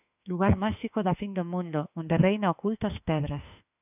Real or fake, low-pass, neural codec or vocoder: fake; 3.6 kHz; autoencoder, 48 kHz, 32 numbers a frame, DAC-VAE, trained on Japanese speech